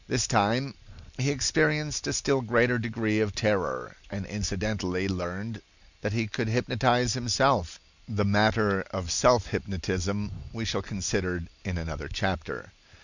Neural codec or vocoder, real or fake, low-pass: none; real; 7.2 kHz